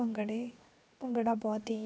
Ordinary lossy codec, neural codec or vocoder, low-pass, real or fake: none; codec, 16 kHz, 0.7 kbps, FocalCodec; none; fake